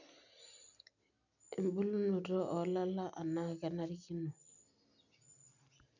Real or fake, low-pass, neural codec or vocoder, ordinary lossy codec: real; 7.2 kHz; none; none